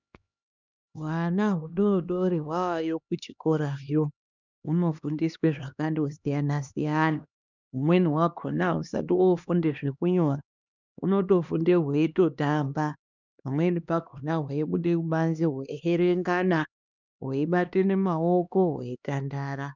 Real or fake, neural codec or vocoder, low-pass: fake; codec, 16 kHz, 2 kbps, X-Codec, HuBERT features, trained on LibriSpeech; 7.2 kHz